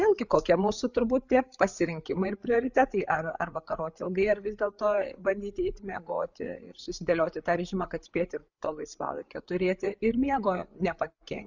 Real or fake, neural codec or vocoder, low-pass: fake; codec, 16 kHz, 8 kbps, FreqCodec, larger model; 7.2 kHz